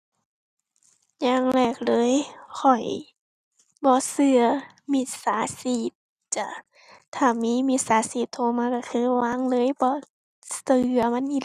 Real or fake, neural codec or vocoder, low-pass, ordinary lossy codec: real; none; 14.4 kHz; Opus, 64 kbps